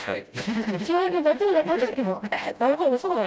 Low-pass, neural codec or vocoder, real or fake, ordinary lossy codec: none; codec, 16 kHz, 0.5 kbps, FreqCodec, smaller model; fake; none